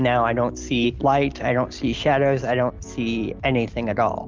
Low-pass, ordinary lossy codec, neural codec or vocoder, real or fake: 7.2 kHz; Opus, 32 kbps; none; real